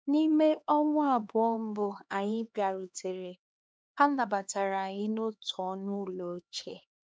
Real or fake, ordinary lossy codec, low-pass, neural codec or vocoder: fake; none; none; codec, 16 kHz, 2 kbps, X-Codec, HuBERT features, trained on LibriSpeech